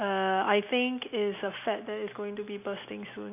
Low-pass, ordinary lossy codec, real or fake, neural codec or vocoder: 3.6 kHz; none; real; none